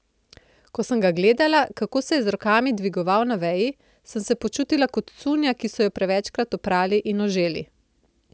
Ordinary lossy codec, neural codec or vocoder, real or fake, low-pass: none; none; real; none